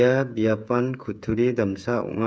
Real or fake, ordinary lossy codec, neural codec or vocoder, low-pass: fake; none; codec, 16 kHz, 8 kbps, FreqCodec, smaller model; none